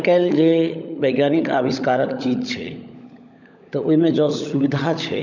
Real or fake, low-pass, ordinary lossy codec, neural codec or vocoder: fake; 7.2 kHz; none; codec, 16 kHz, 16 kbps, FunCodec, trained on LibriTTS, 50 frames a second